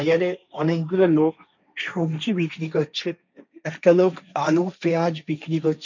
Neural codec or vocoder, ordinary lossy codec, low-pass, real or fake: codec, 16 kHz, 1.1 kbps, Voila-Tokenizer; none; 7.2 kHz; fake